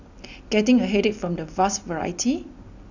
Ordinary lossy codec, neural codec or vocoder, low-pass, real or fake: none; none; 7.2 kHz; real